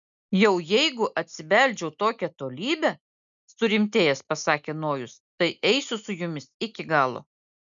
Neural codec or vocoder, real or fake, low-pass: none; real; 7.2 kHz